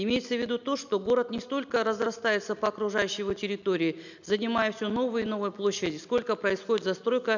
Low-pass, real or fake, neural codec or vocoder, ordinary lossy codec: 7.2 kHz; real; none; none